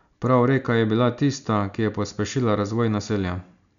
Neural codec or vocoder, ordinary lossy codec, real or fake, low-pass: none; none; real; 7.2 kHz